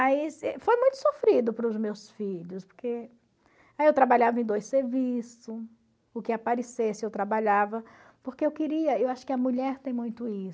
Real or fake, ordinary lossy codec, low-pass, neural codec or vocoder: real; none; none; none